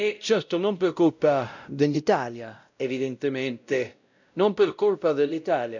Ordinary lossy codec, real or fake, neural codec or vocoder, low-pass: none; fake; codec, 16 kHz, 0.5 kbps, X-Codec, WavLM features, trained on Multilingual LibriSpeech; 7.2 kHz